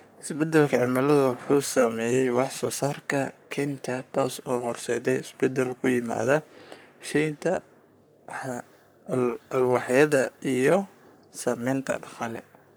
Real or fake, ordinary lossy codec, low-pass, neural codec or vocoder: fake; none; none; codec, 44.1 kHz, 3.4 kbps, Pupu-Codec